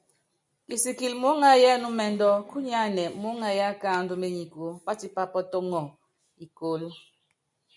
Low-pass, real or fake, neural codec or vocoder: 10.8 kHz; real; none